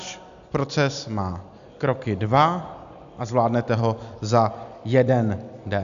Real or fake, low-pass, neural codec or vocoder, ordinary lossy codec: real; 7.2 kHz; none; AAC, 96 kbps